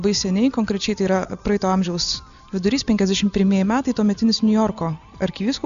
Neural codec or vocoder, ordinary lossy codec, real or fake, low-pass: none; MP3, 96 kbps; real; 7.2 kHz